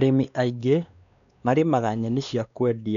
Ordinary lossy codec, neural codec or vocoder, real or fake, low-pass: none; codec, 16 kHz, 2 kbps, X-Codec, WavLM features, trained on Multilingual LibriSpeech; fake; 7.2 kHz